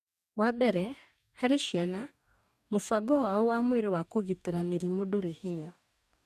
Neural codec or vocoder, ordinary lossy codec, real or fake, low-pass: codec, 44.1 kHz, 2.6 kbps, DAC; none; fake; 14.4 kHz